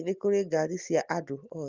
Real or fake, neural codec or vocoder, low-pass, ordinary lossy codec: real; none; 7.2 kHz; Opus, 24 kbps